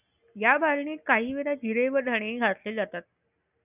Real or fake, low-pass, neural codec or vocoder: real; 3.6 kHz; none